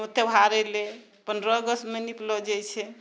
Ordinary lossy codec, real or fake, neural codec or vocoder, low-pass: none; real; none; none